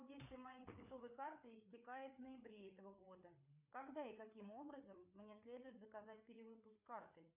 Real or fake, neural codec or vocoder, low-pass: fake; codec, 16 kHz, 4 kbps, FreqCodec, larger model; 3.6 kHz